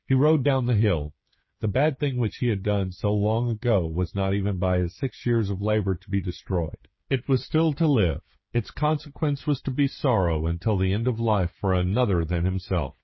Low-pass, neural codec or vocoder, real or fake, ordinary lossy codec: 7.2 kHz; codec, 16 kHz, 16 kbps, FreqCodec, smaller model; fake; MP3, 24 kbps